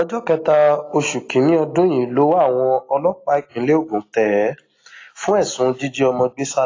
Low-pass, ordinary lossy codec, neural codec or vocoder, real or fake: 7.2 kHz; AAC, 32 kbps; none; real